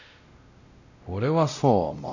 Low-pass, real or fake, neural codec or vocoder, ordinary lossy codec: 7.2 kHz; fake; codec, 16 kHz, 0.5 kbps, X-Codec, WavLM features, trained on Multilingual LibriSpeech; none